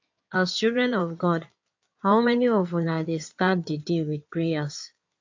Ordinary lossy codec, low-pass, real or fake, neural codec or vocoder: AAC, 48 kbps; 7.2 kHz; fake; codec, 16 kHz in and 24 kHz out, 2.2 kbps, FireRedTTS-2 codec